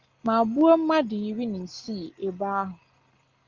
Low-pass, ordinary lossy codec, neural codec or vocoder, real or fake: 7.2 kHz; Opus, 32 kbps; none; real